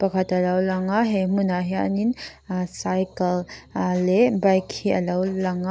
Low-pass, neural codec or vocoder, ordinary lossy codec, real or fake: none; none; none; real